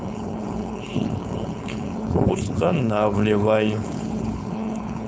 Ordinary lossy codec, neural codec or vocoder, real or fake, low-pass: none; codec, 16 kHz, 4.8 kbps, FACodec; fake; none